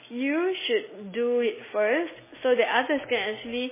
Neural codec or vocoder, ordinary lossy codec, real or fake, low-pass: none; MP3, 16 kbps; real; 3.6 kHz